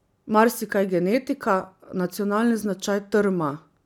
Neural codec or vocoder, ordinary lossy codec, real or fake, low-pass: none; none; real; 19.8 kHz